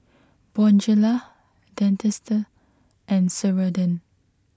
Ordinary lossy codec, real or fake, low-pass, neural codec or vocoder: none; real; none; none